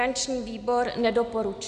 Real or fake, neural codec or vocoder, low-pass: real; none; 9.9 kHz